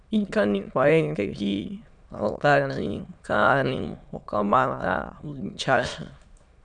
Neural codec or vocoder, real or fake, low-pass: autoencoder, 22.05 kHz, a latent of 192 numbers a frame, VITS, trained on many speakers; fake; 9.9 kHz